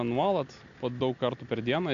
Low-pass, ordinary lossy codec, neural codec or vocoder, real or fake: 7.2 kHz; AAC, 64 kbps; none; real